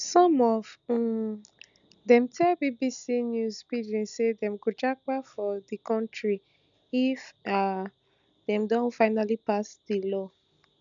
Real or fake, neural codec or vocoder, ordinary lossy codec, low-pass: real; none; none; 7.2 kHz